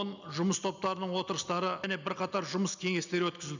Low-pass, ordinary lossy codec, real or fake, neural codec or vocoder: 7.2 kHz; none; real; none